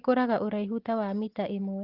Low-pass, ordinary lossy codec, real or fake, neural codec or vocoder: 5.4 kHz; Opus, 16 kbps; real; none